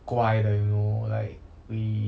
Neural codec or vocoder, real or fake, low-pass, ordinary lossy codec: none; real; none; none